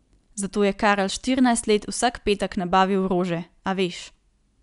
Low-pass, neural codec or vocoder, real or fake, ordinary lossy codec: 10.8 kHz; none; real; none